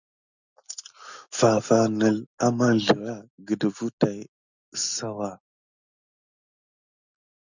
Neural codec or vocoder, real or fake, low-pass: none; real; 7.2 kHz